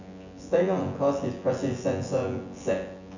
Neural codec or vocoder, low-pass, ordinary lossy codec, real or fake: vocoder, 24 kHz, 100 mel bands, Vocos; 7.2 kHz; none; fake